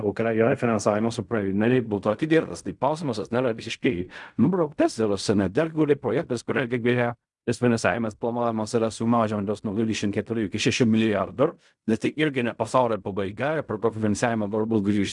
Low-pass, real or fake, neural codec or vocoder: 10.8 kHz; fake; codec, 16 kHz in and 24 kHz out, 0.4 kbps, LongCat-Audio-Codec, fine tuned four codebook decoder